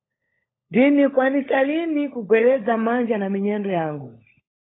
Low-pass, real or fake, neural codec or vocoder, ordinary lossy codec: 7.2 kHz; fake; codec, 16 kHz, 16 kbps, FunCodec, trained on LibriTTS, 50 frames a second; AAC, 16 kbps